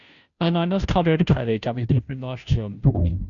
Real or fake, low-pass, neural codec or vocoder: fake; 7.2 kHz; codec, 16 kHz, 0.5 kbps, FunCodec, trained on Chinese and English, 25 frames a second